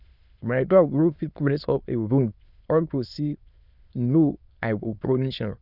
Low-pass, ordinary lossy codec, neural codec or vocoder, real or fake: 5.4 kHz; Opus, 64 kbps; autoencoder, 22.05 kHz, a latent of 192 numbers a frame, VITS, trained on many speakers; fake